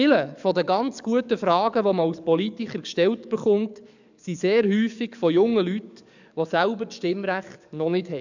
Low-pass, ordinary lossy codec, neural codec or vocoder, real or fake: 7.2 kHz; none; codec, 44.1 kHz, 7.8 kbps, DAC; fake